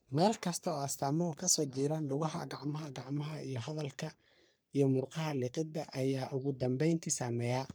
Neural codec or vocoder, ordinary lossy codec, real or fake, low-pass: codec, 44.1 kHz, 3.4 kbps, Pupu-Codec; none; fake; none